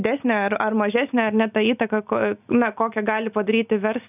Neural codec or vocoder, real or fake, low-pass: none; real; 3.6 kHz